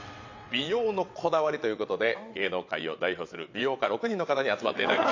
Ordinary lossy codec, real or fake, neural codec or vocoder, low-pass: AAC, 48 kbps; fake; vocoder, 22.05 kHz, 80 mel bands, WaveNeXt; 7.2 kHz